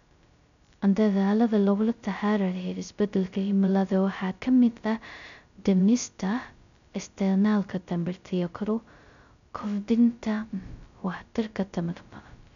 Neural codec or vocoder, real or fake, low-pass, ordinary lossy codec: codec, 16 kHz, 0.2 kbps, FocalCodec; fake; 7.2 kHz; none